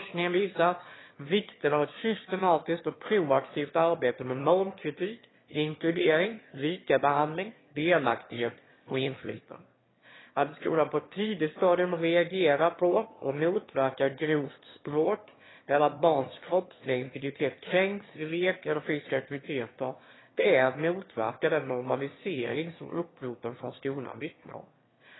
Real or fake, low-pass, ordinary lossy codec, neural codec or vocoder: fake; 7.2 kHz; AAC, 16 kbps; autoencoder, 22.05 kHz, a latent of 192 numbers a frame, VITS, trained on one speaker